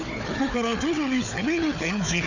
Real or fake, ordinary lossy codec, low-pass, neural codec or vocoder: fake; none; 7.2 kHz; codec, 16 kHz, 4 kbps, FunCodec, trained on Chinese and English, 50 frames a second